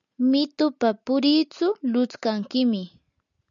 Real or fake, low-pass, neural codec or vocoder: real; 7.2 kHz; none